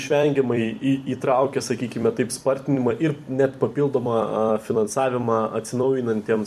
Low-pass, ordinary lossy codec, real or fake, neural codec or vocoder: 14.4 kHz; MP3, 96 kbps; fake; vocoder, 44.1 kHz, 128 mel bands every 256 samples, BigVGAN v2